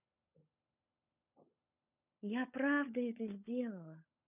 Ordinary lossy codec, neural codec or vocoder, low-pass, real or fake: none; codec, 16 kHz, 16 kbps, FunCodec, trained on LibriTTS, 50 frames a second; 3.6 kHz; fake